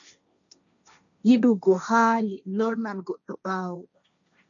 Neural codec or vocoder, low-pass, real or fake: codec, 16 kHz, 1.1 kbps, Voila-Tokenizer; 7.2 kHz; fake